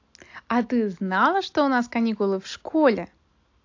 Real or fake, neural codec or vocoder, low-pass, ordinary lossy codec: real; none; 7.2 kHz; AAC, 48 kbps